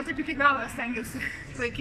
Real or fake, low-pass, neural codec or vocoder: fake; 14.4 kHz; codec, 44.1 kHz, 2.6 kbps, SNAC